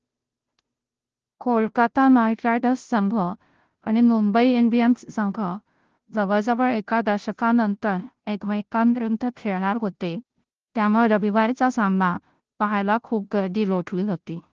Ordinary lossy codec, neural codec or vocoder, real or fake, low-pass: Opus, 16 kbps; codec, 16 kHz, 0.5 kbps, FunCodec, trained on Chinese and English, 25 frames a second; fake; 7.2 kHz